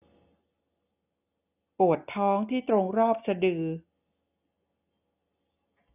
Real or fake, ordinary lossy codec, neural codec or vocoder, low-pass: real; none; none; 3.6 kHz